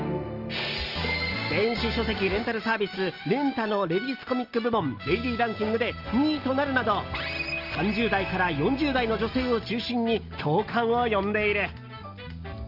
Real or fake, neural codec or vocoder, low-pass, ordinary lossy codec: real; none; 5.4 kHz; Opus, 24 kbps